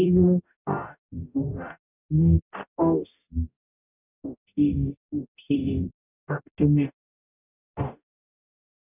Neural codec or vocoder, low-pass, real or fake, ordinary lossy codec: codec, 44.1 kHz, 0.9 kbps, DAC; 3.6 kHz; fake; none